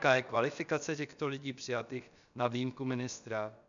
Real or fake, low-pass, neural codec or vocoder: fake; 7.2 kHz; codec, 16 kHz, about 1 kbps, DyCAST, with the encoder's durations